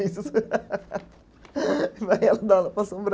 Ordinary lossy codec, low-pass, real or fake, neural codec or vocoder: none; none; real; none